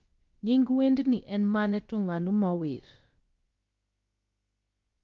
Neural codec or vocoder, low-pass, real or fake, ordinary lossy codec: codec, 16 kHz, about 1 kbps, DyCAST, with the encoder's durations; 7.2 kHz; fake; Opus, 32 kbps